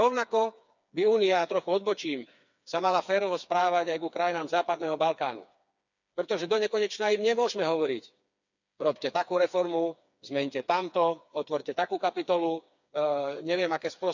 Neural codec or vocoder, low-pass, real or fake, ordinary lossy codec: codec, 16 kHz, 4 kbps, FreqCodec, smaller model; 7.2 kHz; fake; none